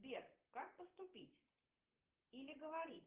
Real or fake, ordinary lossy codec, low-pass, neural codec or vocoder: fake; Opus, 16 kbps; 3.6 kHz; vocoder, 44.1 kHz, 128 mel bands, Pupu-Vocoder